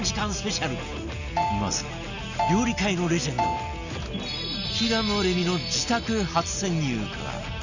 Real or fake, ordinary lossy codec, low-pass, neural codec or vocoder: real; none; 7.2 kHz; none